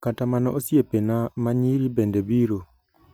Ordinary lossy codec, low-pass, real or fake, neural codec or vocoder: none; none; real; none